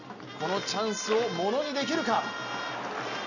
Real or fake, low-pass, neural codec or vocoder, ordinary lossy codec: real; 7.2 kHz; none; none